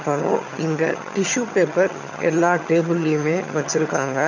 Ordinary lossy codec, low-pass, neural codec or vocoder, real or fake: none; 7.2 kHz; vocoder, 22.05 kHz, 80 mel bands, HiFi-GAN; fake